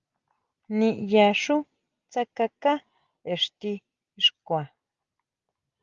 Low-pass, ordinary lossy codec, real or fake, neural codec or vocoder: 7.2 kHz; Opus, 32 kbps; real; none